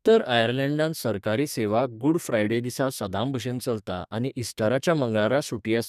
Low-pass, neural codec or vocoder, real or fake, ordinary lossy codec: 14.4 kHz; codec, 32 kHz, 1.9 kbps, SNAC; fake; none